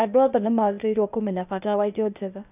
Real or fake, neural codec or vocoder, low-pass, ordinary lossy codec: fake; codec, 16 kHz, 0.8 kbps, ZipCodec; 3.6 kHz; none